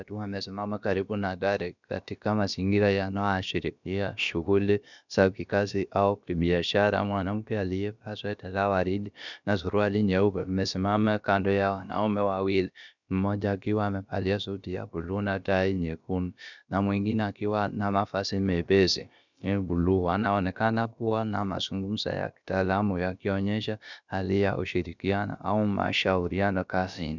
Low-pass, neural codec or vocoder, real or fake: 7.2 kHz; codec, 16 kHz, about 1 kbps, DyCAST, with the encoder's durations; fake